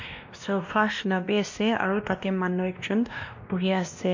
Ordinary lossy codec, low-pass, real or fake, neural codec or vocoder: MP3, 48 kbps; 7.2 kHz; fake; codec, 16 kHz, 1 kbps, X-Codec, WavLM features, trained on Multilingual LibriSpeech